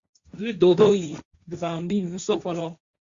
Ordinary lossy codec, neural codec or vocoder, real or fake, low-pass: Opus, 64 kbps; codec, 16 kHz, 1.1 kbps, Voila-Tokenizer; fake; 7.2 kHz